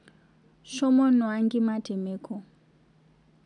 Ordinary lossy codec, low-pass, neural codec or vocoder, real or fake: none; 10.8 kHz; none; real